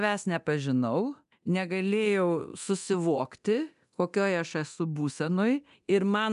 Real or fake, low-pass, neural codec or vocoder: fake; 10.8 kHz; codec, 24 kHz, 0.9 kbps, DualCodec